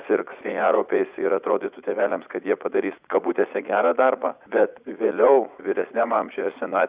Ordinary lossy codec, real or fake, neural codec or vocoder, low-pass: Opus, 64 kbps; fake; vocoder, 44.1 kHz, 80 mel bands, Vocos; 3.6 kHz